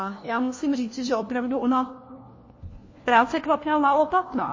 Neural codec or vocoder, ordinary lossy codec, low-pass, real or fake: codec, 16 kHz, 1 kbps, FunCodec, trained on LibriTTS, 50 frames a second; MP3, 32 kbps; 7.2 kHz; fake